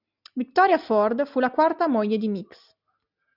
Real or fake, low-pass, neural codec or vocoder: real; 5.4 kHz; none